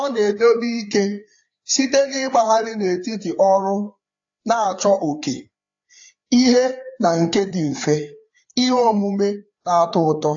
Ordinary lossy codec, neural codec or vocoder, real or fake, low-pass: AAC, 48 kbps; codec, 16 kHz, 4 kbps, FreqCodec, larger model; fake; 7.2 kHz